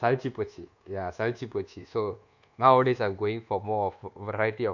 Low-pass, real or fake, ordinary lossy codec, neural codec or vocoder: 7.2 kHz; fake; none; codec, 24 kHz, 1.2 kbps, DualCodec